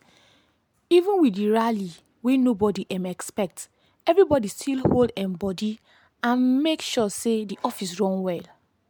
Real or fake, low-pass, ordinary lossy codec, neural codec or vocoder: real; 19.8 kHz; MP3, 96 kbps; none